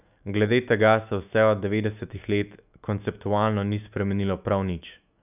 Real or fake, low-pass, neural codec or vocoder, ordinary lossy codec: fake; 3.6 kHz; autoencoder, 48 kHz, 128 numbers a frame, DAC-VAE, trained on Japanese speech; none